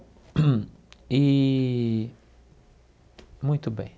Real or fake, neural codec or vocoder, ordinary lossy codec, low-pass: real; none; none; none